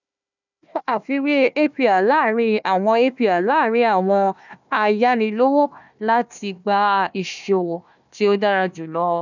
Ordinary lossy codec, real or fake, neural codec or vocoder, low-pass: none; fake; codec, 16 kHz, 1 kbps, FunCodec, trained on Chinese and English, 50 frames a second; 7.2 kHz